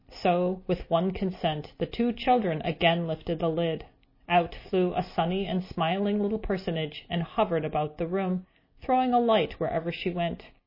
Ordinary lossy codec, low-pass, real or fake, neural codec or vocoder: MP3, 24 kbps; 5.4 kHz; real; none